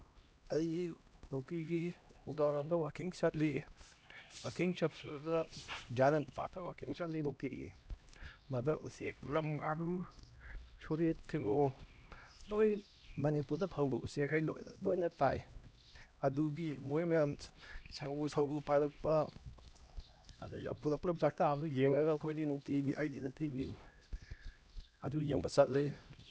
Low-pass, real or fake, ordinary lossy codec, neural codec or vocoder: none; fake; none; codec, 16 kHz, 1 kbps, X-Codec, HuBERT features, trained on LibriSpeech